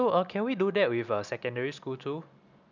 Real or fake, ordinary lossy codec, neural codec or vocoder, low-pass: real; none; none; 7.2 kHz